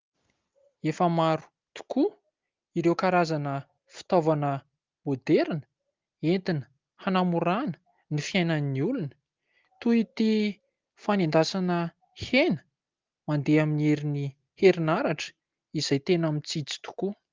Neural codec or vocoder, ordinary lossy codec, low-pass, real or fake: none; Opus, 24 kbps; 7.2 kHz; real